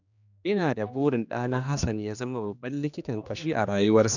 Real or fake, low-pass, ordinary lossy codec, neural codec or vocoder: fake; 7.2 kHz; none; codec, 16 kHz, 2 kbps, X-Codec, HuBERT features, trained on general audio